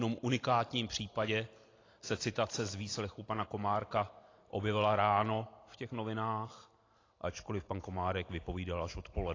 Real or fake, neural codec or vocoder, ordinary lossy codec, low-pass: real; none; AAC, 32 kbps; 7.2 kHz